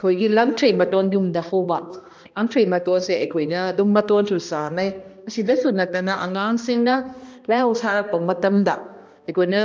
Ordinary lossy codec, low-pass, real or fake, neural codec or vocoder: none; none; fake; codec, 16 kHz, 1 kbps, X-Codec, HuBERT features, trained on balanced general audio